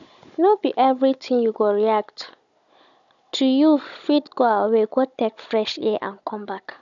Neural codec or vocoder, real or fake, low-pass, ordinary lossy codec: codec, 16 kHz, 16 kbps, FunCodec, trained on Chinese and English, 50 frames a second; fake; 7.2 kHz; none